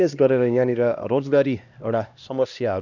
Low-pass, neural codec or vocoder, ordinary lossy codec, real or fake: 7.2 kHz; codec, 16 kHz, 1 kbps, X-Codec, HuBERT features, trained on LibriSpeech; none; fake